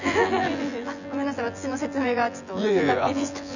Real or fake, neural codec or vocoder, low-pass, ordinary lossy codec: fake; vocoder, 24 kHz, 100 mel bands, Vocos; 7.2 kHz; none